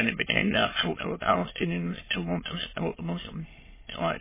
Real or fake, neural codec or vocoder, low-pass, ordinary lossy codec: fake; autoencoder, 22.05 kHz, a latent of 192 numbers a frame, VITS, trained on many speakers; 3.6 kHz; MP3, 16 kbps